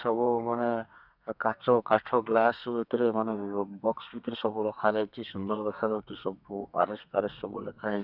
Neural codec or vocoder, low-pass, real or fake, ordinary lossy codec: codec, 32 kHz, 1.9 kbps, SNAC; 5.4 kHz; fake; none